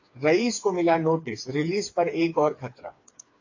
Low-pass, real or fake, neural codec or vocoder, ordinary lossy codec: 7.2 kHz; fake; codec, 16 kHz, 4 kbps, FreqCodec, smaller model; AAC, 48 kbps